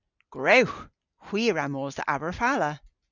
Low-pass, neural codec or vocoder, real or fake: 7.2 kHz; none; real